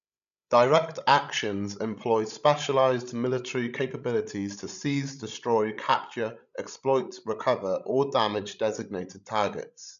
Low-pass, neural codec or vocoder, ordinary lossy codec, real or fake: 7.2 kHz; codec, 16 kHz, 16 kbps, FreqCodec, larger model; none; fake